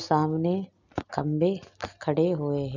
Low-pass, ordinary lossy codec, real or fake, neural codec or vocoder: 7.2 kHz; none; real; none